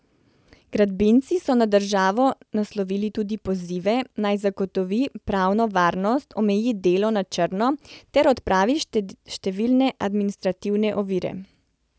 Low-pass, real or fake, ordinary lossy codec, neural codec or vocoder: none; real; none; none